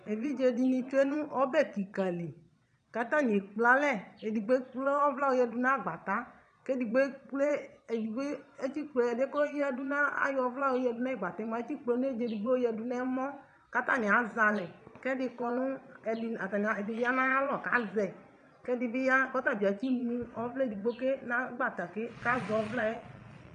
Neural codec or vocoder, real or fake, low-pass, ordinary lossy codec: vocoder, 22.05 kHz, 80 mel bands, Vocos; fake; 9.9 kHz; MP3, 96 kbps